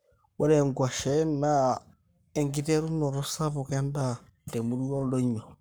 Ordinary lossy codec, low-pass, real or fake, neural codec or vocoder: none; none; fake; codec, 44.1 kHz, 7.8 kbps, Pupu-Codec